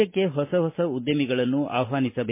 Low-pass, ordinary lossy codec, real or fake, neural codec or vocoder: 3.6 kHz; MP3, 24 kbps; real; none